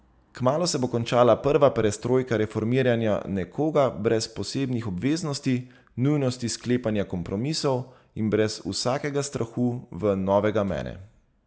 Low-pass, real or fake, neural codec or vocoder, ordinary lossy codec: none; real; none; none